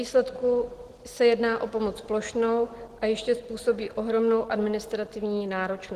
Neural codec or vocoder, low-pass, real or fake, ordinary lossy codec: none; 14.4 kHz; real; Opus, 16 kbps